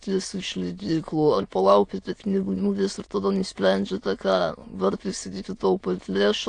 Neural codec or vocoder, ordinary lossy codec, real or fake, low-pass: autoencoder, 22.05 kHz, a latent of 192 numbers a frame, VITS, trained on many speakers; AAC, 48 kbps; fake; 9.9 kHz